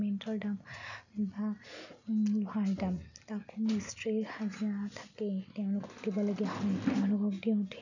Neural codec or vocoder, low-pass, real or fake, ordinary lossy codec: none; 7.2 kHz; real; none